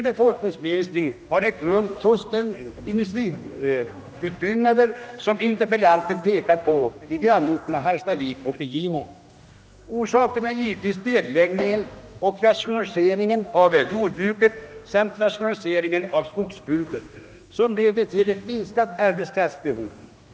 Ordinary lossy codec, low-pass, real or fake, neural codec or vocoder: none; none; fake; codec, 16 kHz, 1 kbps, X-Codec, HuBERT features, trained on general audio